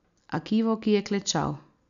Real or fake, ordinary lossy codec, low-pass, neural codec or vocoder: real; none; 7.2 kHz; none